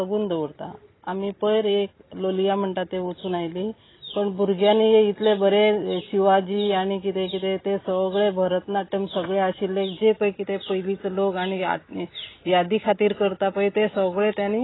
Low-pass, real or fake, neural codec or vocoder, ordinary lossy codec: 7.2 kHz; real; none; AAC, 16 kbps